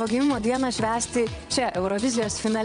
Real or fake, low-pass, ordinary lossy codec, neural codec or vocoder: fake; 9.9 kHz; MP3, 64 kbps; vocoder, 22.05 kHz, 80 mel bands, WaveNeXt